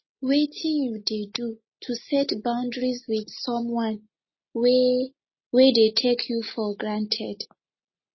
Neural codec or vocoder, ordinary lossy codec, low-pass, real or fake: none; MP3, 24 kbps; 7.2 kHz; real